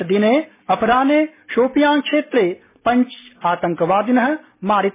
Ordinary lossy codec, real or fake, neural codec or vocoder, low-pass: MP3, 16 kbps; real; none; 3.6 kHz